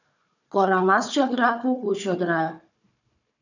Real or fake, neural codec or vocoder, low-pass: fake; codec, 16 kHz, 4 kbps, FunCodec, trained on Chinese and English, 50 frames a second; 7.2 kHz